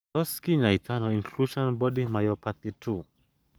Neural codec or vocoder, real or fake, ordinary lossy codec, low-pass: codec, 44.1 kHz, 7.8 kbps, Pupu-Codec; fake; none; none